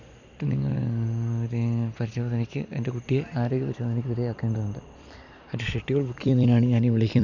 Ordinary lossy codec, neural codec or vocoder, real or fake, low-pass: none; vocoder, 44.1 kHz, 128 mel bands every 256 samples, BigVGAN v2; fake; 7.2 kHz